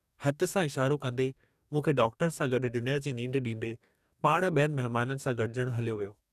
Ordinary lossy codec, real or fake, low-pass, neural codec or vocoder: none; fake; 14.4 kHz; codec, 44.1 kHz, 2.6 kbps, DAC